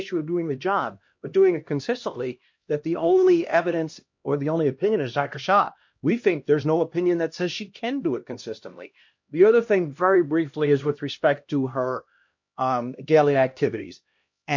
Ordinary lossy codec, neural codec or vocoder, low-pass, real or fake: MP3, 48 kbps; codec, 16 kHz, 1 kbps, X-Codec, HuBERT features, trained on LibriSpeech; 7.2 kHz; fake